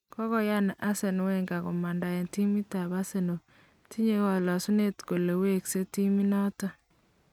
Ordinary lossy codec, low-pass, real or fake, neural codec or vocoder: none; 19.8 kHz; real; none